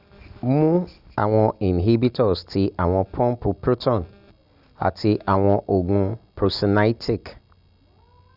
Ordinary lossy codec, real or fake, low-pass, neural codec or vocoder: Opus, 64 kbps; real; 5.4 kHz; none